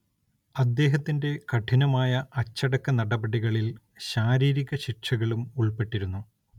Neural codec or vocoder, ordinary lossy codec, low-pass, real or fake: none; none; 19.8 kHz; real